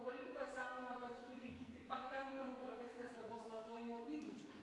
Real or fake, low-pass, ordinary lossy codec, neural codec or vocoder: fake; 10.8 kHz; AAC, 48 kbps; codec, 44.1 kHz, 2.6 kbps, SNAC